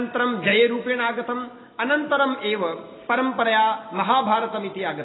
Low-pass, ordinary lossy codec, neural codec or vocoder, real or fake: 7.2 kHz; AAC, 16 kbps; none; real